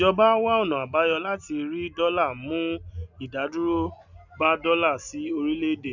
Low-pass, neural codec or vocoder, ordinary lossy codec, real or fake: 7.2 kHz; none; none; real